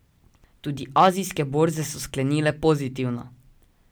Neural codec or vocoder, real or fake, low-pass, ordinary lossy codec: none; real; none; none